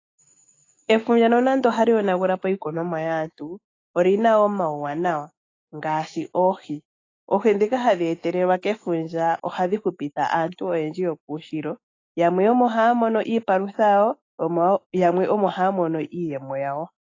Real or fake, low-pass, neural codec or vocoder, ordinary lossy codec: fake; 7.2 kHz; autoencoder, 48 kHz, 128 numbers a frame, DAC-VAE, trained on Japanese speech; AAC, 32 kbps